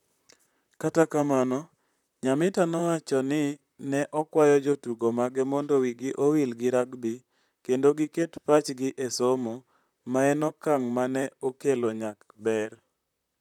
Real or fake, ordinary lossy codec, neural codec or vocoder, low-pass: fake; none; vocoder, 44.1 kHz, 128 mel bands, Pupu-Vocoder; 19.8 kHz